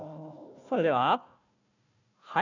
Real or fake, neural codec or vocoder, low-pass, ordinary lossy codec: fake; codec, 16 kHz, 1 kbps, FunCodec, trained on Chinese and English, 50 frames a second; 7.2 kHz; none